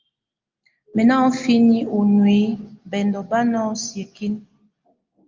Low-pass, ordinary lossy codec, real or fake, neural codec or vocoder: 7.2 kHz; Opus, 32 kbps; real; none